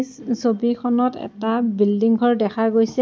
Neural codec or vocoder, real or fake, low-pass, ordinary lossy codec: none; real; none; none